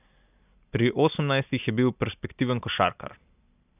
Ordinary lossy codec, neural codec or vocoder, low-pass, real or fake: none; none; 3.6 kHz; real